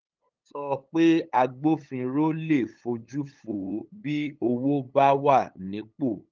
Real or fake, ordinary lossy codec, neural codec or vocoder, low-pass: fake; Opus, 32 kbps; codec, 16 kHz, 8 kbps, FunCodec, trained on LibriTTS, 25 frames a second; 7.2 kHz